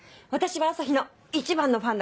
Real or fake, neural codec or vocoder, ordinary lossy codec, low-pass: real; none; none; none